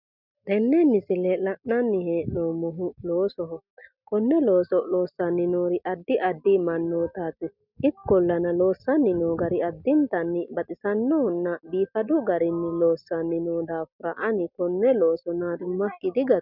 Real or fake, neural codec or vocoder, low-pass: real; none; 5.4 kHz